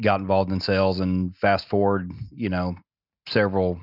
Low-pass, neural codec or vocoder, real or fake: 5.4 kHz; none; real